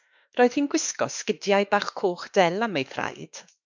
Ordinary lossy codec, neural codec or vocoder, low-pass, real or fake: MP3, 64 kbps; autoencoder, 48 kHz, 32 numbers a frame, DAC-VAE, trained on Japanese speech; 7.2 kHz; fake